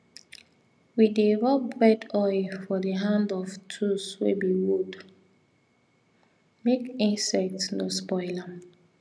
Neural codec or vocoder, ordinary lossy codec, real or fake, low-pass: none; none; real; none